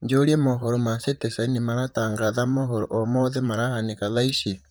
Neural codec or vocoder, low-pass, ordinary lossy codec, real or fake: vocoder, 44.1 kHz, 128 mel bands, Pupu-Vocoder; none; none; fake